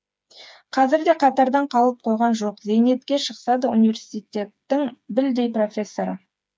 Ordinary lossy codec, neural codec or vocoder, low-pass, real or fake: none; codec, 16 kHz, 4 kbps, FreqCodec, smaller model; none; fake